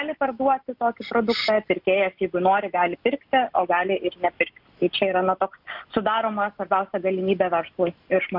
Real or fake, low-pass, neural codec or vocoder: real; 5.4 kHz; none